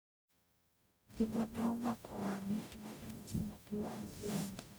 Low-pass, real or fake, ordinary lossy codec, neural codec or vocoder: none; fake; none; codec, 44.1 kHz, 0.9 kbps, DAC